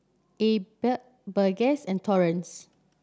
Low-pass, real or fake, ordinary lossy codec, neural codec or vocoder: none; real; none; none